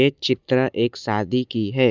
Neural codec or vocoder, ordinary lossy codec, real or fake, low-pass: none; none; real; 7.2 kHz